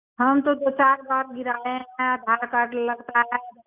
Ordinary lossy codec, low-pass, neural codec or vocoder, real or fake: none; 3.6 kHz; none; real